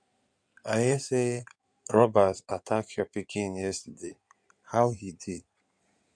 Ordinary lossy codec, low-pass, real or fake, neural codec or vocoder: none; 9.9 kHz; fake; codec, 16 kHz in and 24 kHz out, 2.2 kbps, FireRedTTS-2 codec